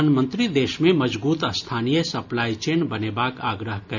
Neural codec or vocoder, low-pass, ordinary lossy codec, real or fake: none; 7.2 kHz; none; real